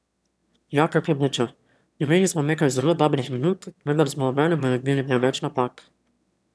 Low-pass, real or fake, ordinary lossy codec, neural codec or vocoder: none; fake; none; autoencoder, 22.05 kHz, a latent of 192 numbers a frame, VITS, trained on one speaker